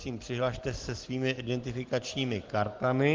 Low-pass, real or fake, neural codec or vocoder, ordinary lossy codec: 7.2 kHz; real; none; Opus, 16 kbps